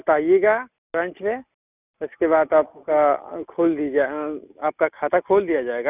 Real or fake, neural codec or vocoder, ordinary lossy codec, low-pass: real; none; none; 3.6 kHz